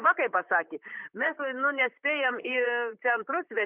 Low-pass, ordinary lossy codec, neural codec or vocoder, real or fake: 3.6 kHz; Opus, 64 kbps; vocoder, 44.1 kHz, 128 mel bands, Pupu-Vocoder; fake